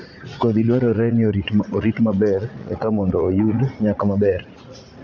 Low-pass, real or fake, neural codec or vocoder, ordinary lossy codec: 7.2 kHz; fake; vocoder, 44.1 kHz, 80 mel bands, Vocos; none